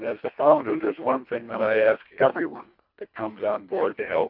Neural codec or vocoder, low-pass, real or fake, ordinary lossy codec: codec, 24 kHz, 1.5 kbps, HILCodec; 5.4 kHz; fake; MP3, 48 kbps